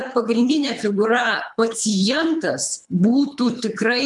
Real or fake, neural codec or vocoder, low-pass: fake; codec, 24 kHz, 3 kbps, HILCodec; 10.8 kHz